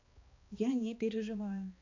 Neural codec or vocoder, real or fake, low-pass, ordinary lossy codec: codec, 16 kHz, 2 kbps, X-Codec, HuBERT features, trained on balanced general audio; fake; 7.2 kHz; AAC, 48 kbps